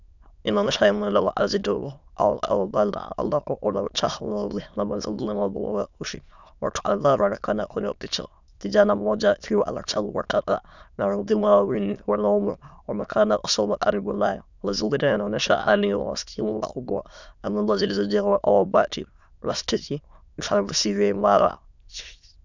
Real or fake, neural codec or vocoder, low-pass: fake; autoencoder, 22.05 kHz, a latent of 192 numbers a frame, VITS, trained on many speakers; 7.2 kHz